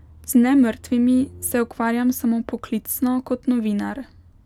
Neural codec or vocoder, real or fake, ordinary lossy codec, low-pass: none; real; none; 19.8 kHz